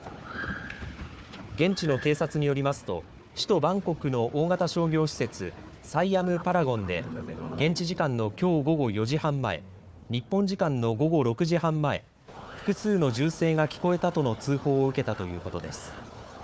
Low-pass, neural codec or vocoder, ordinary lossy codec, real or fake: none; codec, 16 kHz, 4 kbps, FunCodec, trained on Chinese and English, 50 frames a second; none; fake